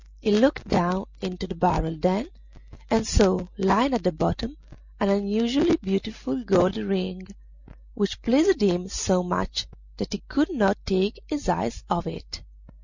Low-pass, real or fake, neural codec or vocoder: 7.2 kHz; real; none